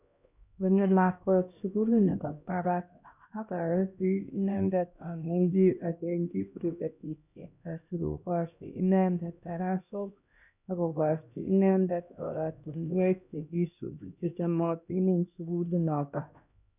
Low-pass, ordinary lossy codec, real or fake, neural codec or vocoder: 3.6 kHz; none; fake; codec, 16 kHz, 1 kbps, X-Codec, HuBERT features, trained on LibriSpeech